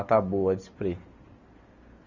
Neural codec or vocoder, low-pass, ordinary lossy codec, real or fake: none; 7.2 kHz; none; real